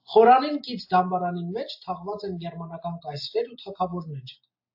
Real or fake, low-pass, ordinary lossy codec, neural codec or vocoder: real; 5.4 kHz; AAC, 48 kbps; none